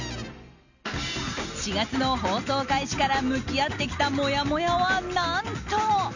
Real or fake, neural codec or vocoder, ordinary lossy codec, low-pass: real; none; none; 7.2 kHz